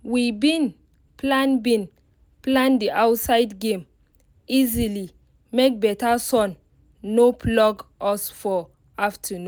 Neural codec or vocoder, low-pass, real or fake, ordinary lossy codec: none; none; real; none